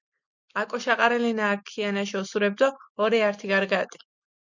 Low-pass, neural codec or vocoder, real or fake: 7.2 kHz; none; real